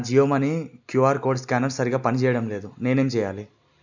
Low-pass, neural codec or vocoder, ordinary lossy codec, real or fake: 7.2 kHz; none; none; real